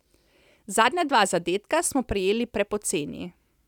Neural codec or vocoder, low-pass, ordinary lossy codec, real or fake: none; 19.8 kHz; none; real